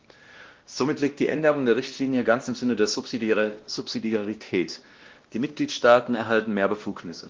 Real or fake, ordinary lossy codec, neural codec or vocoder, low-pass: fake; Opus, 16 kbps; codec, 16 kHz, 1 kbps, X-Codec, WavLM features, trained on Multilingual LibriSpeech; 7.2 kHz